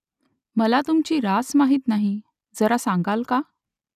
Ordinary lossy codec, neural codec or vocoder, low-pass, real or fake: none; none; 14.4 kHz; real